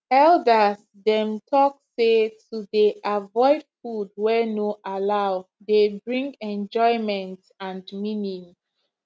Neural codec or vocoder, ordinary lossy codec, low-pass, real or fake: none; none; none; real